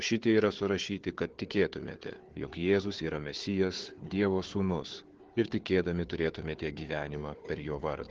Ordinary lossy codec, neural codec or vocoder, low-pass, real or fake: Opus, 16 kbps; codec, 16 kHz, 4 kbps, FunCodec, trained on Chinese and English, 50 frames a second; 7.2 kHz; fake